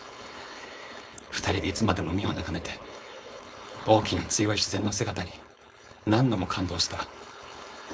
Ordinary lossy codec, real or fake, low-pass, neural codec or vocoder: none; fake; none; codec, 16 kHz, 4.8 kbps, FACodec